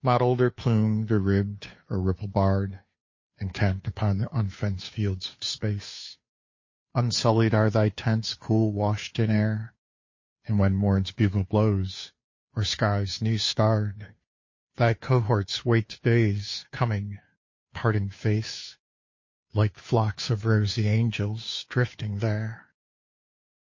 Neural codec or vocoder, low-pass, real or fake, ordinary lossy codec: codec, 16 kHz, 2 kbps, FunCodec, trained on Chinese and English, 25 frames a second; 7.2 kHz; fake; MP3, 32 kbps